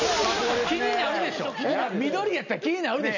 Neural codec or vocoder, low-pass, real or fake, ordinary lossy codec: none; 7.2 kHz; real; none